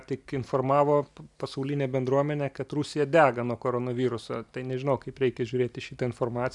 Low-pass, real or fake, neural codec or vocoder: 10.8 kHz; real; none